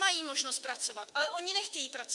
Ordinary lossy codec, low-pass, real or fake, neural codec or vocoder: Opus, 64 kbps; 10.8 kHz; fake; autoencoder, 48 kHz, 32 numbers a frame, DAC-VAE, trained on Japanese speech